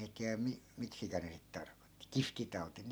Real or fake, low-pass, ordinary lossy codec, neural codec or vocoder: real; none; none; none